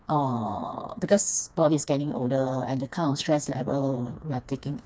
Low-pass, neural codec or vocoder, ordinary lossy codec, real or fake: none; codec, 16 kHz, 2 kbps, FreqCodec, smaller model; none; fake